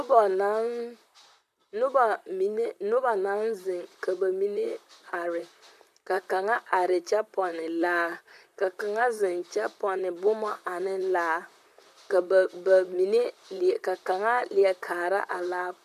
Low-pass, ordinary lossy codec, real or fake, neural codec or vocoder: 14.4 kHz; MP3, 96 kbps; fake; vocoder, 44.1 kHz, 128 mel bands, Pupu-Vocoder